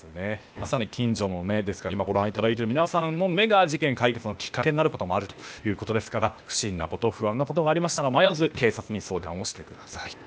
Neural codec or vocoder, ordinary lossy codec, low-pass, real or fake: codec, 16 kHz, 0.8 kbps, ZipCodec; none; none; fake